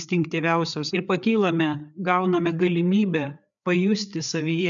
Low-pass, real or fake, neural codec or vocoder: 7.2 kHz; fake; codec, 16 kHz, 4 kbps, FreqCodec, larger model